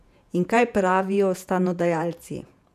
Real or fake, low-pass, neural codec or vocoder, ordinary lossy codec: fake; 14.4 kHz; vocoder, 48 kHz, 128 mel bands, Vocos; none